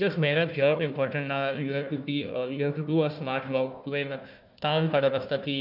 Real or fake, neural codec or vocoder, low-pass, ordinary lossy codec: fake; codec, 16 kHz, 1 kbps, FunCodec, trained on Chinese and English, 50 frames a second; 5.4 kHz; none